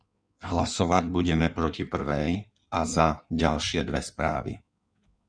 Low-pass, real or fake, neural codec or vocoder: 9.9 kHz; fake; codec, 16 kHz in and 24 kHz out, 1.1 kbps, FireRedTTS-2 codec